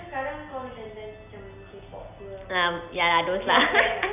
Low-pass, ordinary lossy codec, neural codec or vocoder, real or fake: 3.6 kHz; none; none; real